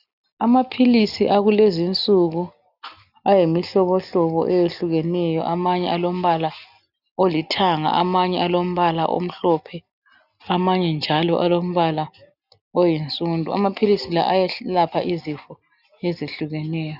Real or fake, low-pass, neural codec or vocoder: real; 5.4 kHz; none